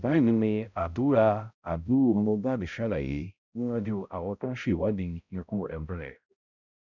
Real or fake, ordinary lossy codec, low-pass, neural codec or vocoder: fake; none; 7.2 kHz; codec, 16 kHz, 0.5 kbps, X-Codec, HuBERT features, trained on balanced general audio